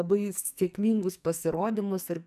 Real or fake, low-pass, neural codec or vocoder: fake; 14.4 kHz; codec, 44.1 kHz, 2.6 kbps, SNAC